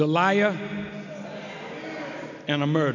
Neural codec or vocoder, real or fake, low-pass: none; real; 7.2 kHz